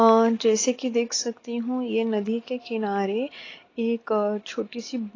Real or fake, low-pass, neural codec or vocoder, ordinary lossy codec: real; 7.2 kHz; none; AAC, 32 kbps